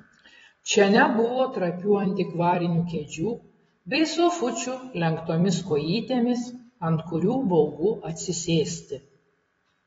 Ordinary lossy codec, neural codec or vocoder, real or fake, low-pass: AAC, 24 kbps; vocoder, 44.1 kHz, 128 mel bands every 256 samples, BigVGAN v2; fake; 19.8 kHz